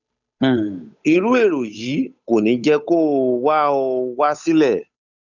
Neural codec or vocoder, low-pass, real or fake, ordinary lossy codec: codec, 16 kHz, 8 kbps, FunCodec, trained on Chinese and English, 25 frames a second; 7.2 kHz; fake; none